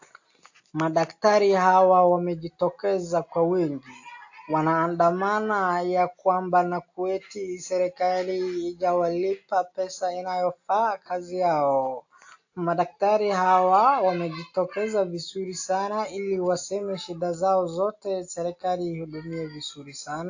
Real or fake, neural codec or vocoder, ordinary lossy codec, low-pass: real; none; AAC, 48 kbps; 7.2 kHz